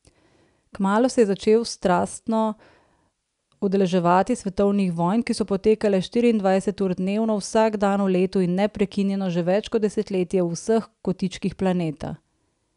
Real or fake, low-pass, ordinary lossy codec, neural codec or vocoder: real; 10.8 kHz; none; none